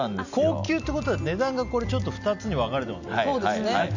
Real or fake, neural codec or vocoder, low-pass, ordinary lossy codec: real; none; 7.2 kHz; none